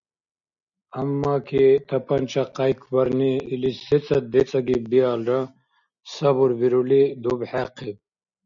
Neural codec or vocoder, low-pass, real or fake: none; 7.2 kHz; real